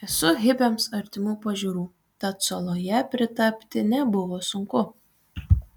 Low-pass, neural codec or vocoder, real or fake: 19.8 kHz; none; real